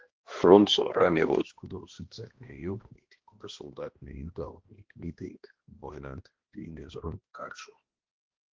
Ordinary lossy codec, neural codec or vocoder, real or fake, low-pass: Opus, 16 kbps; codec, 16 kHz, 1 kbps, X-Codec, HuBERT features, trained on balanced general audio; fake; 7.2 kHz